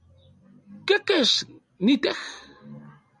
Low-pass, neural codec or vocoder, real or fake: 10.8 kHz; none; real